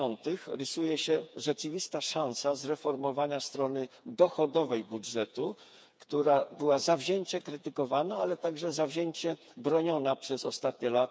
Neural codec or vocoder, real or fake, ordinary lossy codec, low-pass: codec, 16 kHz, 4 kbps, FreqCodec, smaller model; fake; none; none